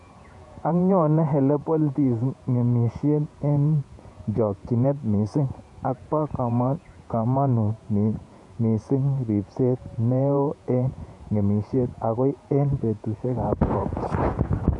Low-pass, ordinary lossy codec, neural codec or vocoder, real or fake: 10.8 kHz; AAC, 64 kbps; vocoder, 48 kHz, 128 mel bands, Vocos; fake